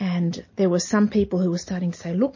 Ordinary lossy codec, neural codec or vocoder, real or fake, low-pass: MP3, 32 kbps; none; real; 7.2 kHz